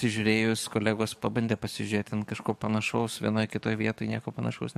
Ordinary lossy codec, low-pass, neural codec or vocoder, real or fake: MP3, 64 kbps; 14.4 kHz; codec, 44.1 kHz, 7.8 kbps, DAC; fake